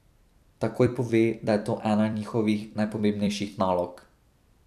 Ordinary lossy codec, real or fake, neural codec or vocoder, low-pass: none; fake; vocoder, 44.1 kHz, 128 mel bands every 256 samples, BigVGAN v2; 14.4 kHz